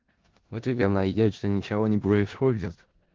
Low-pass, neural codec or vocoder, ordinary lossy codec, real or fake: 7.2 kHz; codec, 16 kHz in and 24 kHz out, 0.4 kbps, LongCat-Audio-Codec, four codebook decoder; Opus, 16 kbps; fake